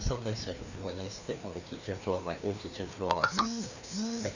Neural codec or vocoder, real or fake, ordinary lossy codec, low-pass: codec, 16 kHz, 2 kbps, FreqCodec, larger model; fake; none; 7.2 kHz